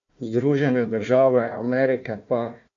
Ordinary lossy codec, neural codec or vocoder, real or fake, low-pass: AAC, 64 kbps; codec, 16 kHz, 1 kbps, FunCodec, trained on Chinese and English, 50 frames a second; fake; 7.2 kHz